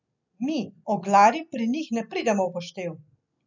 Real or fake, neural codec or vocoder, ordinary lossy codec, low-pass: real; none; none; 7.2 kHz